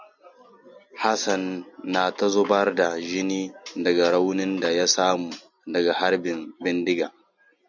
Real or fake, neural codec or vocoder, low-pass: real; none; 7.2 kHz